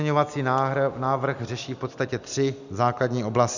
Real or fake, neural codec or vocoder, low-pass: real; none; 7.2 kHz